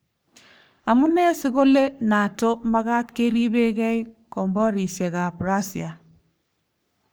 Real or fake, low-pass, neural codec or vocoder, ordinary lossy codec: fake; none; codec, 44.1 kHz, 3.4 kbps, Pupu-Codec; none